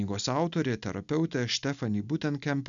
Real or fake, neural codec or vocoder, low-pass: real; none; 7.2 kHz